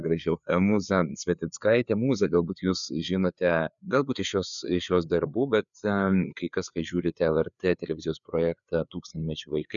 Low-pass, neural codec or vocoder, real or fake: 7.2 kHz; codec, 16 kHz, 4 kbps, FreqCodec, larger model; fake